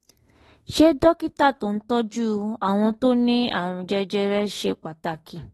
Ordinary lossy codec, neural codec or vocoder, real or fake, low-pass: AAC, 32 kbps; autoencoder, 48 kHz, 32 numbers a frame, DAC-VAE, trained on Japanese speech; fake; 19.8 kHz